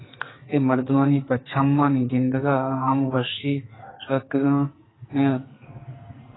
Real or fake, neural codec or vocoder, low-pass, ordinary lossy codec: fake; codec, 44.1 kHz, 2.6 kbps, SNAC; 7.2 kHz; AAC, 16 kbps